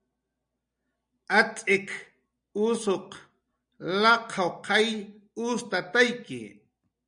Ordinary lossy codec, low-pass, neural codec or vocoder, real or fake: MP3, 96 kbps; 9.9 kHz; none; real